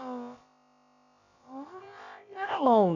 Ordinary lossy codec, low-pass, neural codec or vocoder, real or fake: none; 7.2 kHz; codec, 16 kHz, about 1 kbps, DyCAST, with the encoder's durations; fake